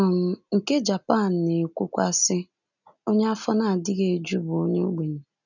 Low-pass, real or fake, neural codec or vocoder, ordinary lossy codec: 7.2 kHz; real; none; none